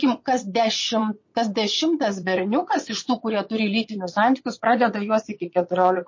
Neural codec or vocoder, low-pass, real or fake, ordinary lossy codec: vocoder, 22.05 kHz, 80 mel bands, WaveNeXt; 7.2 kHz; fake; MP3, 32 kbps